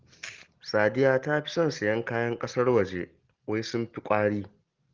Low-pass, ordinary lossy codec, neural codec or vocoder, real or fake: 7.2 kHz; Opus, 16 kbps; none; real